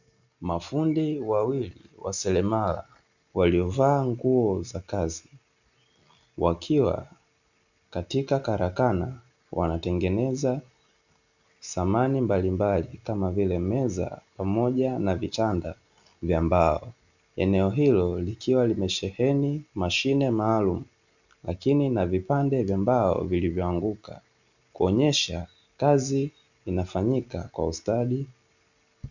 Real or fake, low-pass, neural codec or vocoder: real; 7.2 kHz; none